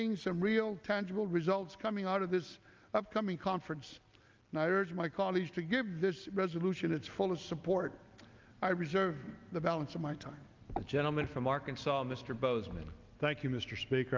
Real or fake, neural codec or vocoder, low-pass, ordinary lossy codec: real; none; 7.2 kHz; Opus, 24 kbps